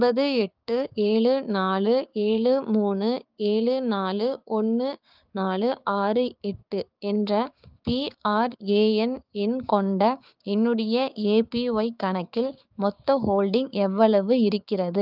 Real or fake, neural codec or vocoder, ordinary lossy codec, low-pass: fake; codec, 16 kHz, 6 kbps, DAC; Opus, 24 kbps; 5.4 kHz